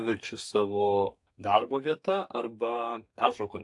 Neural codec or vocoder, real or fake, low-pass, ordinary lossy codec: codec, 32 kHz, 1.9 kbps, SNAC; fake; 10.8 kHz; AAC, 64 kbps